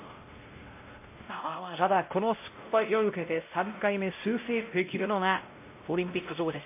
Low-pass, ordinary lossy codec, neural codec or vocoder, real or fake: 3.6 kHz; MP3, 24 kbps; codec, 16 kHz, 0.5 kbps, X-Codec, WavLM features, trained on Multilingual LibriSpeech; fake